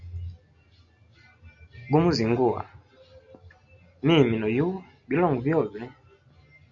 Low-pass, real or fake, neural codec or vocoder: 7.2 kHz; real; none